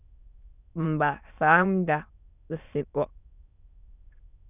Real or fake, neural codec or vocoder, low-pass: fake; autoencoder, 22.05 kHz, a latent of 192 numbers a frame, VITS, trained on many speakers; 3.6 kHz